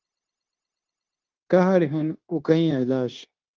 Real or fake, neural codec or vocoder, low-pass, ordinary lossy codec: fake; codec, 16 kHz, 0.9 kbps, LongCat-Audio-Codec; 7.2 kHz; Opus, 32 kbps